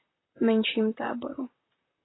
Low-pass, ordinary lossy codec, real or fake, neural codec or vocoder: 7.2 kHz; AAC, 16 kbps; fake; vocoder, 44.1 kHz, 128 mel bands, Pupu-Vocoder